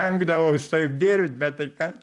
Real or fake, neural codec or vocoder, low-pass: fake; vocoder, 44.1 kHz, 128 mel bands, Pupu-Vocoder; 10.8 kHz